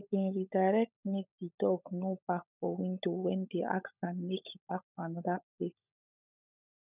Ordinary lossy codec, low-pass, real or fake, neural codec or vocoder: none; 3.6 kHz; fake; codec, 16 kHz, 16 kbps, FunCodec, trained on LibriTTS, 50 frames a second